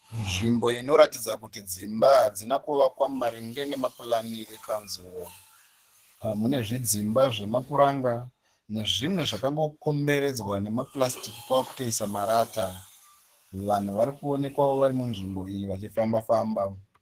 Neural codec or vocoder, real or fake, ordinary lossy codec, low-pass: codec, 32 kHz, 1.9 kbps, SNAC; fake; Opus, 16 kbps; 14.4 kHz